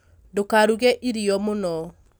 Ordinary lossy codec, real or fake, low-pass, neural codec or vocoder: none; real; none; none